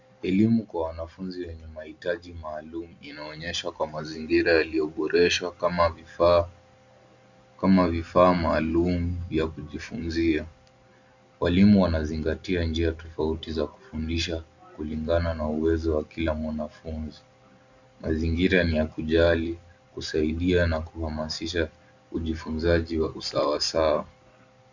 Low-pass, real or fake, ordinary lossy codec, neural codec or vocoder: 7.2 kHz; real; Opus, 64 kbps; none